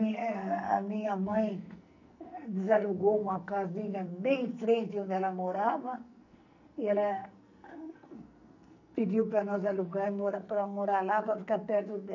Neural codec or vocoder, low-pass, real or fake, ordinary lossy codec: codec, 44.1 kHz, 2.6 kbps, SNAC; 7.2 kHz; fake; none